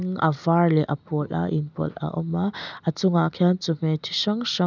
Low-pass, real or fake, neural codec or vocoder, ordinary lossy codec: 7.2 kHz; real; none; none